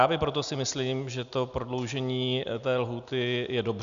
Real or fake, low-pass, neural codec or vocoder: real; 7.2 kHz; none